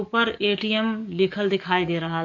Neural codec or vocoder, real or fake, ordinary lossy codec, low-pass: codec, 44.1 kHz, 7.8 kbps, DAC; fake; none; 7.2 kHz